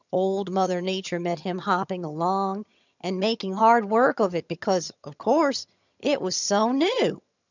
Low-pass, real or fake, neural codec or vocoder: 7.2 kHz; fake; vocoder, 22.05 kHz, 80 mel bands, HiFi-GAN